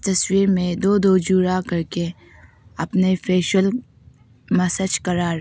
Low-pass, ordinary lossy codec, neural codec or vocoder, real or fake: none; none; none; real